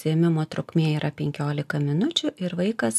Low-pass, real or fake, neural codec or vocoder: 14.4 kHz; real; none